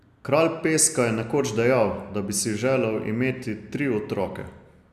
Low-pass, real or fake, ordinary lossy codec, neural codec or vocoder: 14.4 kHz; real; none; none